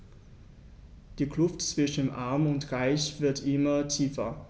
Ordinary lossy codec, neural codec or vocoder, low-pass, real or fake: none; none; none; real